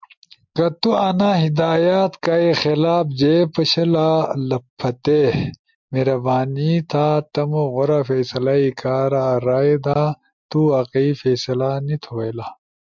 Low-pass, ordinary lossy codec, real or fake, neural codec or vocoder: 7.2 kHz; MP3, 64 kbps; real; none